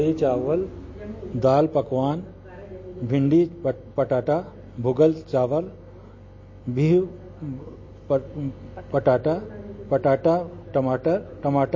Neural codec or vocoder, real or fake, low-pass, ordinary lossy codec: none; real; 7.2 kHz; MP3, 32 kbps